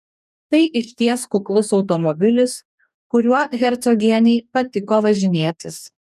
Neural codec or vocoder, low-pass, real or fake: codec, 44.1 kHz, 2.6 kbps, DAC; 14.4 kHz; fake